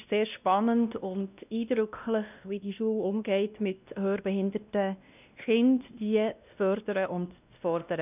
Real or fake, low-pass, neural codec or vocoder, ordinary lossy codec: fake; 3.6 kHz; codec, 16 kHz, 1 kbps, X-Codec, WavLM features, trained on Multilingual LibriSpeech; none